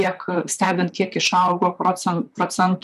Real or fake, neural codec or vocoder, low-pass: fake; vocoder, 44.1 kHz, 128 mel bands, Pupu-Vocoder; 14.4 kHz